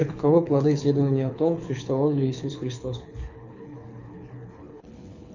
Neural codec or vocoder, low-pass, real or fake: codec, 24 kHz, 6 kbps, HILCodec; 7.2 kHz; fake